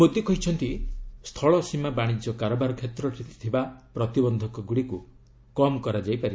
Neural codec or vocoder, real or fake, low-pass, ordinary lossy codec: none; real; none; none